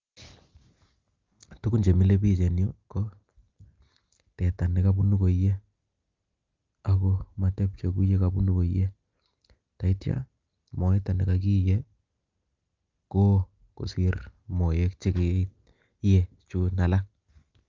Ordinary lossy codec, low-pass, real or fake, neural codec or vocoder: Opus, 32 kbps; 7.2 kHz; real; none